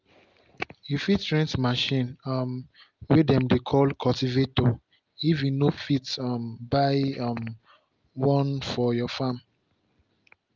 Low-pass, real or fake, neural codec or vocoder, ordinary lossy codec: 7.2 kHz; real; none; Opus, 24 kbps